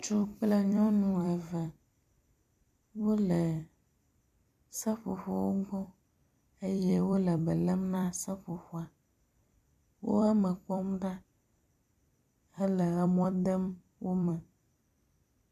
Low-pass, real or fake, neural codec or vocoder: 14.4 kHz; fake; vocoder, 44.1 kHz, 128 mel bands every 256 samples, BigVGAN v2